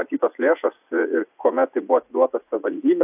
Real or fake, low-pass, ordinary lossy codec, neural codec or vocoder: fake; 3.6 kHz; AAC, 32 kbps; vocoder, 44.1 kHz, 80 mel bands, Vocos